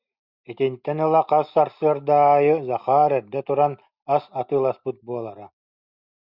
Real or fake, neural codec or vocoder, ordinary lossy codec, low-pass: real; none; Opus, 64 kbps; 5.4 kHz